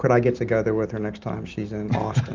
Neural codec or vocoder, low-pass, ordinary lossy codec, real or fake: none; 7.2 kHz; Opus, 32 kbps; real